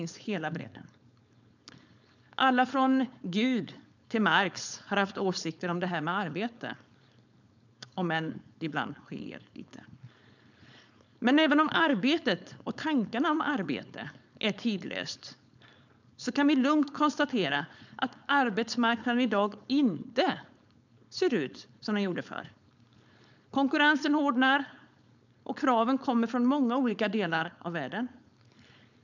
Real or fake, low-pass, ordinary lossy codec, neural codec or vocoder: fake; 7.2 kHz; none; codec, 16 kHz, 4.8 kbps, FACodec